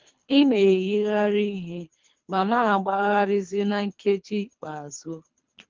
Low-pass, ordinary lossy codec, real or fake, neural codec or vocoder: 7.2 kHz; Opus, 24 kbps; fake; codec, 24 kHz, 3 kbps, HILCodec